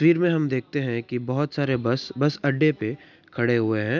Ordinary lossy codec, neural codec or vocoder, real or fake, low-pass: none; none; real; 7.2 kHz